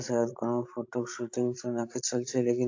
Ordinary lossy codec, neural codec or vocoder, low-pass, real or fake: AAC, 48 kbps; codec, 16 kHz, 16 kbps, FreqCodec, larger model; 7.2 kHz; fake